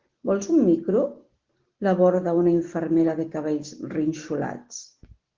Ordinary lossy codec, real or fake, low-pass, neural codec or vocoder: Opus, 16 kbps; real; 7.2 kHz; none